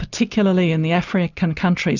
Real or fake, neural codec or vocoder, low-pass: real; none; 7.2 kHz